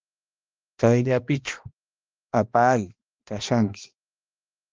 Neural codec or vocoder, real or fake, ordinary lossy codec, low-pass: codec, 16 kHz, 1 kbps, X-Codec, HuBERT features, trained on balanced general audio; fake; Opus, 24 kbps; 7.2 kHz